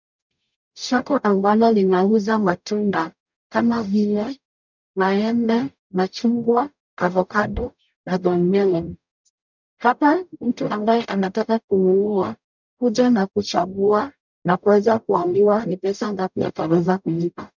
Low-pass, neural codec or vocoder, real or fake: 7.2 kHz; codec, 44.1 kHz, 0.9 kbps, DAC; fake